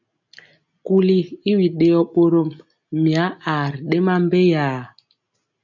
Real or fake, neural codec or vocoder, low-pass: real; none; 7.2 kHz